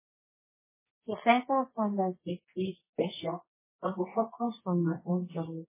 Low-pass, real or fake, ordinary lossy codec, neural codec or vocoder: 3.6 kHz; fake; MP3, 16 kbps; codec, 24 kHz, 0.9 kbps, WavTokenizer, medium music audio release